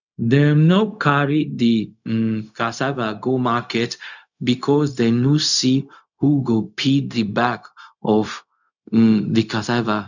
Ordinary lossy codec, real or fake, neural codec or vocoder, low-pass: none; fake; codec, 16 kHz, 0.4 kbps, LongCat-Audio-Codec; 7.2 kHz